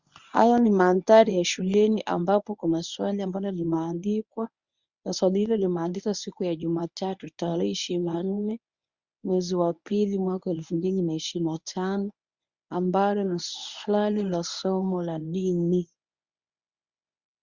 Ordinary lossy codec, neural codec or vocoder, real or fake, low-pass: Opus, 64 kbps; codec, 24 kHz, 0.9 kbps, WavTokenizer, medium speech release version 1; fake; 7.2 kHz